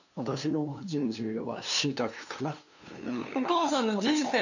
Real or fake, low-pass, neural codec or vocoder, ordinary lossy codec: fake; 7.2 kHz; codec, 16 kHz, 2 kbps, FunCodec, trained on LibriTTS, 25 frames a second; none